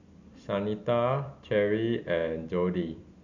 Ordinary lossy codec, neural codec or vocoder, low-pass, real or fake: none; none; 7.2 kHz; real